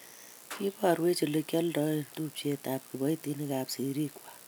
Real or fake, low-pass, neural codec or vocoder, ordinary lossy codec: real; none; none; none